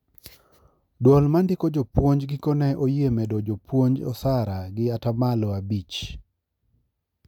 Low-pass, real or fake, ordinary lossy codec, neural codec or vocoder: 19.8 kHz; real; none; none